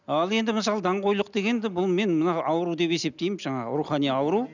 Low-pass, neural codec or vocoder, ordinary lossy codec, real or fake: 7.2 kHz; none; none; real